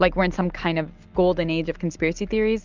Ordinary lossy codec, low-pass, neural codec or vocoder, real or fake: Opus, 24 kbps; 7.2 kHz; none; real